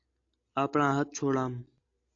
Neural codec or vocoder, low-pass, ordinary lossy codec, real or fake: none; 7.2 kHz; AAC, 48 kbps; real